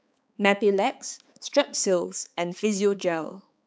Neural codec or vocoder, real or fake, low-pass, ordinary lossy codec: codec, 16 kHz, 4 kbps, X-Codec, HuBERT features, trained on balanced general audio; fake; none; none